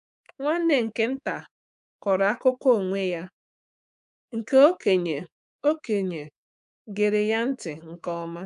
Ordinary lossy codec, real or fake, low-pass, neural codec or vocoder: none; fake; 10.8 kHz; codec, 24 kHz, 3.1 kbps, DualCodec